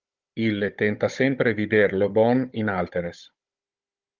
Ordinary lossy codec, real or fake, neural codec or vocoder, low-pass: Opus, 32 kbps; fake; codec, 16 kHz, 16 kbps, FunCodec, trained on Chinese and English, 50 frames a second; 7.2 kHz